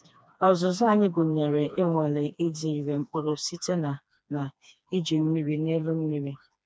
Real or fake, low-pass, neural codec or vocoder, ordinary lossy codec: fake; none; codec, 16 kHz, 2 kbps, FreqCodec, smaller model; none